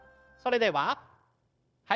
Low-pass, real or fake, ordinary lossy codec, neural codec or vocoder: none; fake; none; codec, 16 kHz, 0.9 kbps, LongCat-Audio-Codec